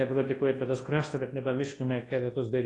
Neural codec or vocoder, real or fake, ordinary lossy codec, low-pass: codec, 24 kHz, 0.9 kbps, WavTokenizer, large speech release; fake; AAC, 32 kbps; 10.8 kHz